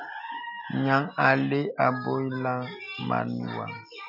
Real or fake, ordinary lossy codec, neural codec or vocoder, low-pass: real; AAC, 48 kbps; none; 5.4 kHz